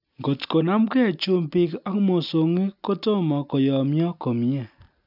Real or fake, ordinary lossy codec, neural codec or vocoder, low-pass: real; none; none; 5.4 kHz